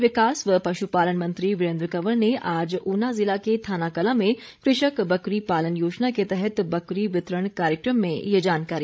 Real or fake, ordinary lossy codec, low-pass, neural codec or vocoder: fake; none; none; codec, 16 kHz, 16 kbps, FreqCodec, larger model